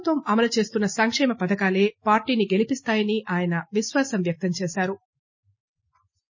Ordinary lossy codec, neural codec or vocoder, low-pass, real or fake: MP3, 32 kbps; none; 7.2 kHz; real